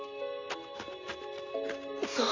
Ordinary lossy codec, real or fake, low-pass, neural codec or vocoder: AAC, 32 kbps; real; 7.2 kHz; none